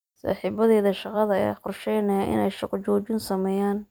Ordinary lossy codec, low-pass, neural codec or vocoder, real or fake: none; none; vocoder, 44.1 kHz, 128 mel bands every 256 samples, BigVGAN v2; fake